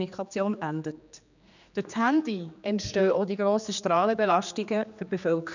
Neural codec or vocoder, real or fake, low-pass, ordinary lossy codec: codec, 16 kHz, 2 kbps, X-Codec, HuBERT features, trained on general audio; fake; 7.2 kHz; none